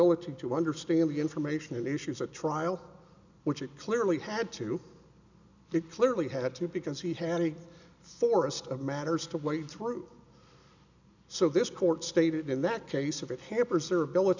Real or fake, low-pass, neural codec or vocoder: real; 7.2 kHz; none